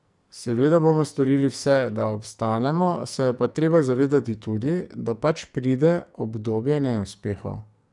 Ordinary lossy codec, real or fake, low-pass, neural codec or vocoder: none; fake; 10.8 kHz; codec, 44.1 kHz, 2.6 kbps, SNAC